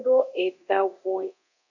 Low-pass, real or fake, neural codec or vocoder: 7.2 kHz; fake; codec, 24 kHz, 0.9 kbps, DualCodec